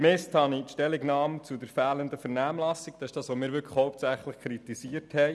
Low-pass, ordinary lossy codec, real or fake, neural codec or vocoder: none; none; real; none